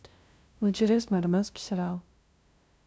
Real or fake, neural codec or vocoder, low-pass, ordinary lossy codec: fake; codec, 16 kHz, 0.5 kbps, FunCodec, trained on LibriTTS, 25 frames a second; none; none